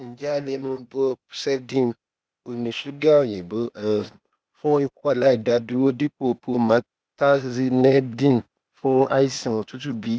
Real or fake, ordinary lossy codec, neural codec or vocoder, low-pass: fake; none; codec, 16 kHz, 0.8 kbps, ZipCodec; none